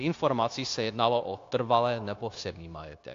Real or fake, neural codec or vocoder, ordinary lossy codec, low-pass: fake; codec, 16 kHz, 0.9 kbps, LongCat-Audio-Codec; AAC, 48 kbps; 7.2 kHz